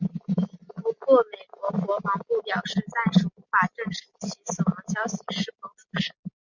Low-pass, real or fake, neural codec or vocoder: 7.2 kHz; real; none